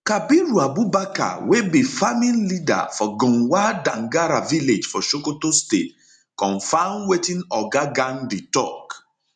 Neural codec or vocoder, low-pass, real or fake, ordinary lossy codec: none; 9.9 kHz; real; none